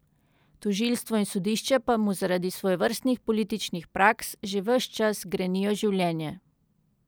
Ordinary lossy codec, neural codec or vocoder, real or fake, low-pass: none; none; real; none